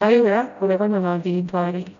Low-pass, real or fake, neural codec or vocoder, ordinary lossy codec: 7.2 kHz; fake; codec, 16 kHz, 0.5 kbps, FreqCodec, smaller model; none